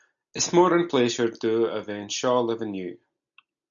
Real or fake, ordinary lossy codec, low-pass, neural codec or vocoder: real; MP3, 96 kbps; 7.2 kHz; none